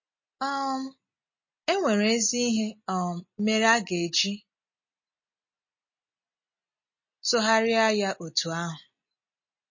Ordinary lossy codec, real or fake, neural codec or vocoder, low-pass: MP3, 32 kbps; real; none; 7.2 kHz